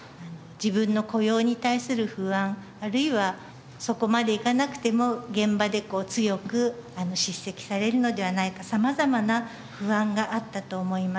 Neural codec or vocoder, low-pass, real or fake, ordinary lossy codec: none; none; real; none